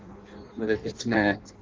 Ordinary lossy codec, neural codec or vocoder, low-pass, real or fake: Opus, 16 kbps; codec, 16 kHz in and 24 kHz out, 0.6 kbps, FireRedTTS-2 codec; 7.2 kHz; fake